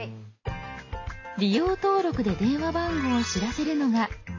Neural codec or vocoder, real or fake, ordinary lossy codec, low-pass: none; real; AAC, 32 kbps; 7.2 kHz